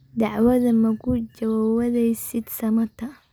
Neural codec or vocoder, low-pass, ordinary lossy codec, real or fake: none; none; none; real